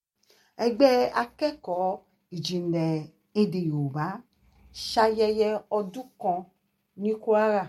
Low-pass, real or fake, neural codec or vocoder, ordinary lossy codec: 19.8 kHz; real; none; MP3, 64 kbps